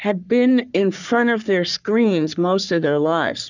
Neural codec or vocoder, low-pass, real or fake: codec, 44.1 kHz, 3.4 kbps, Pupu-Codec; 7.2 kHz; fake